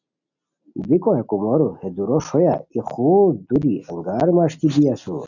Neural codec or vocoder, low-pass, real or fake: none; 7.2 kHz; real